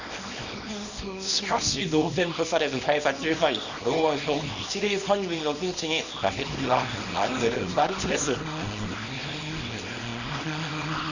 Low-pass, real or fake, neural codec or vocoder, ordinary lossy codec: 7.2 kHz; fake; codec, 24 kHz, 0.9 kbps, WavTokenizer, small release; none